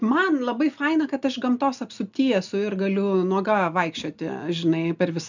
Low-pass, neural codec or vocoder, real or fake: 7.2 kHz; none; real